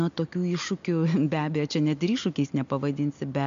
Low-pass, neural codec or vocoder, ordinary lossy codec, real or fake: 7.2 kHz; none; MP3, 64 kbps; real